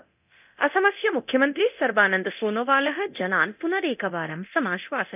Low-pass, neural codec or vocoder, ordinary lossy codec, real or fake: 3.6 kHz; codec, 24 kHz, 0.9 kbps, DualCodec; none; fake